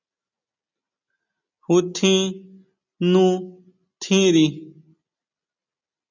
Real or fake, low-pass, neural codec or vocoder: real; 7.2 kHz; none